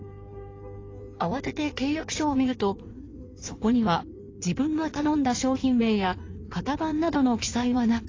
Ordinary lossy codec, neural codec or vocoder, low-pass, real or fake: AAC, 32 kbps; codec, 16 kHz in and 24 kHz out, 1.1 kbps, FireRedTTS-2 codec; 7.2 kHz; fake